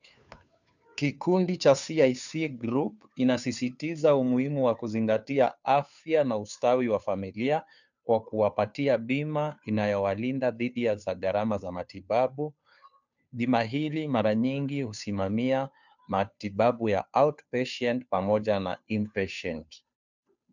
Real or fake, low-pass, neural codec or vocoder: fake; 7.2 kHz; codec, 16 kHz, 2 kbps, FunCodec, trained on Chinese and English, 25 frames a second